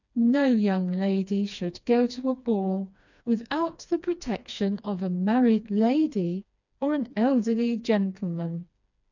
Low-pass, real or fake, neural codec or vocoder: 7.2 kHz; fake; codec, 16 kHz, 2 kbps, FreqCodec, smaller model